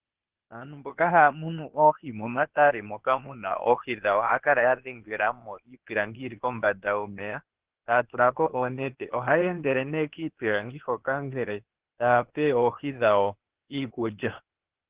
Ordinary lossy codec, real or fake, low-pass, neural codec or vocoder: Opus, 16 kbps; fake; 3.6 kHz; codec, 16 kHz, 0.8 kbps, ZipCodec